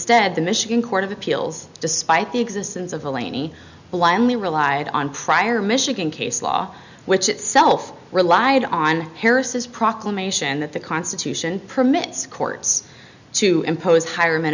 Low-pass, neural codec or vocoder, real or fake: 7.2 kHz; none; real